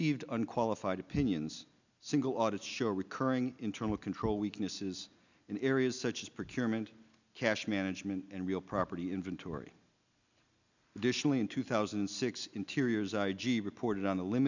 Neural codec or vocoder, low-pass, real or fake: none; 7.2 kHz; real